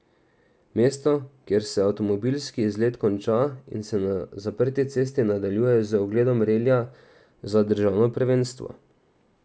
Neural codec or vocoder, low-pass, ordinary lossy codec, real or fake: none; none; none; real